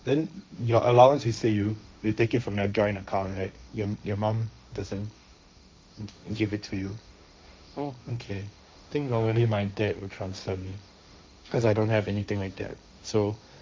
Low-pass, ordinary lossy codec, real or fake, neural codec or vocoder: none; none; fake; codec, 16 kHz, 1.1 kbps, Voila-Tokenizer